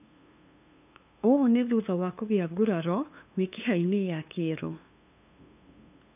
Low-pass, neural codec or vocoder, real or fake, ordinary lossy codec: 3.6 kHz; codec, 16 kHz, 2 kbps, FunCodec, trained on LibriTTS, 25 frames a second; fake; none